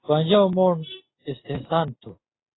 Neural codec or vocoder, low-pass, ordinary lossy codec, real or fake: none; 7.2 kHz; AAC, 16 kbps; real